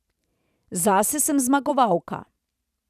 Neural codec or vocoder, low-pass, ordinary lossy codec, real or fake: vocoder, 44.1 kHz, 128 mel bands every 512 samples, BigVGAN v2; 14.4 kHz; none; fake